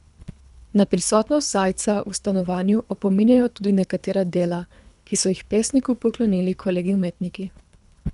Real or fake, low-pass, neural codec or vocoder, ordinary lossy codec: fake; 10.8 kHz; codec, 24 kHz, 3 kbps, HILCodec; none